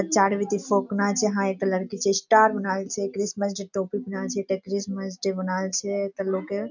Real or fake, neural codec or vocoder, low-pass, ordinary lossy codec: real; none; 7.2 kHz; none